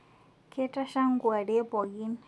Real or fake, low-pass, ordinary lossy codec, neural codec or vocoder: real; none; none; none